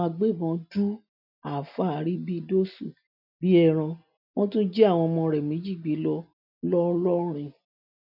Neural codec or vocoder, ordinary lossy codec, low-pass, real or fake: none; none; 5.4 kHz; real